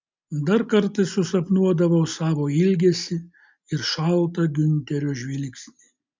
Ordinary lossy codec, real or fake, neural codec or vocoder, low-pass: MP3, 64 kbps; real; none; 7.2 kHz